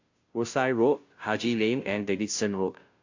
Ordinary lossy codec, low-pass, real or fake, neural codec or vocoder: AAC, 48 kbps; 7.2 kHz; fake; codec, 16 kHz, 0.5 kbps, FunCodec, trained on Chinese and English, 25 frames a second